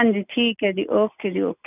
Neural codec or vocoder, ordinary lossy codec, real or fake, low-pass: none; none; real; 3.6 kHz